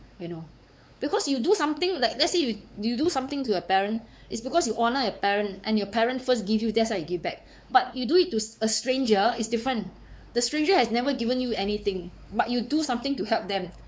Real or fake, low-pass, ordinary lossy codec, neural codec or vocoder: fake; none; none; codec, 16 kHz, 4 kbps, X-Codec, WavLM features, trained on Multilingual LibriSpeech